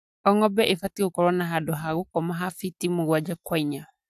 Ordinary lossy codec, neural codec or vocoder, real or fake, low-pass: AAC, 96 kbps; none; real; 14.4 kHz